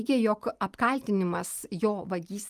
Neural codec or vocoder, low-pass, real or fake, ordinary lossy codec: none; 14.4 kHz; real; Opus, 32 kbps